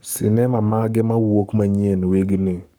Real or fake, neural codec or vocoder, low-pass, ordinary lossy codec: fake; codec, 44.1 kHz, 7.8 kbps, Pupu-Codec; none; none